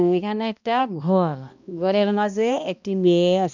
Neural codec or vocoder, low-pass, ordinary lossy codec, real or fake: codec, 16 kHz, 1 kbps, X-Codec, HuBERT features, trained on balanced general audio; 7.2 kHz; none; fake